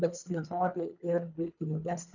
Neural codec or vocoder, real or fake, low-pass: codec, 24 kHz, 3 kbps, HILCodec; fake; 7.2 kHz